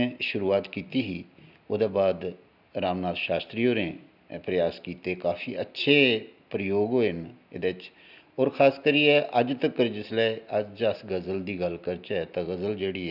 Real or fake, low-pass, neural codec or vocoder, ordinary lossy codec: real; 5.4 kHz; none; none